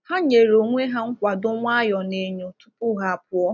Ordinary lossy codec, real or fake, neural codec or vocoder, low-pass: none; real; none; 7.2 kHz